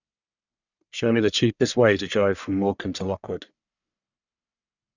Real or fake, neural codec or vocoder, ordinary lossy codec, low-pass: fake; codec, 44.1 kHz, 1.7 kbps, Pupu-Codec; none; 7.2 kHz